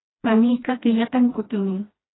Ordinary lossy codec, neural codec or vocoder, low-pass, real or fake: AAC, 16 kbps; codec, 16 kHz, 1 kbps, FreqCodec, smaller model; 7.2 kHz; fake